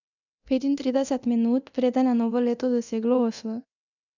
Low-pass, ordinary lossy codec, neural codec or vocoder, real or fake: 7.2 kHz; none; codec, 24 kHz, 0.9 kbps, DualCodec; fake